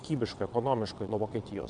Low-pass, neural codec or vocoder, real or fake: 9.9 kHz; vocoder, 22.05 kHz, 80 mel bands, WaveNeXt; fake